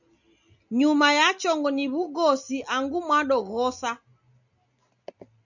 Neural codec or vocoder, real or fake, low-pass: none; real; 7.2 kHz